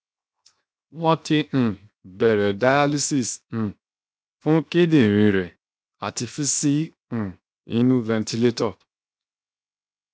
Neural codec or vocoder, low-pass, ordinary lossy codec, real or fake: codec, 16 kHz, 0.7 kbps, FocalCodec; none; none; fake